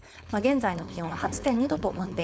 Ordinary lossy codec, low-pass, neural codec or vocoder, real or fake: none; none; codec, 16 kHz, 4.8 kbps, FACodec; fake